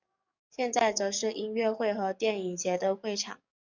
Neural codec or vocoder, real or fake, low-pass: codec, 44.1 kHz, 7.8 kbps, DAC; fake; 7.2 kHz